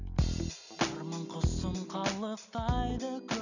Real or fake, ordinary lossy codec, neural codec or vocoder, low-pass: real; none; none; 7.2 kHz